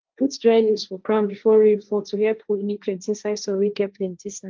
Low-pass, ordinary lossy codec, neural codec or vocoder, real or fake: 7.2 kHz; Opus, 24 kbps; codec, 16 kHz, 1.1 kbps, Voila-Tokenizer; fake